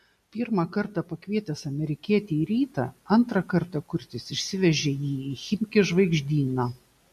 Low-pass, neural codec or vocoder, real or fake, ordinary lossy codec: 14.4 kHz; none; real; MP3, 64 kbps